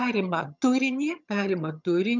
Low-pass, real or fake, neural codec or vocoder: 7.2 kHz; fake; vocoder, 22.05 kHz, 80 mel bands, HiFi-GAN